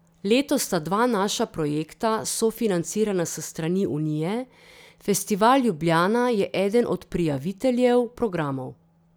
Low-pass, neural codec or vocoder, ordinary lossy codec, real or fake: none; none; none; real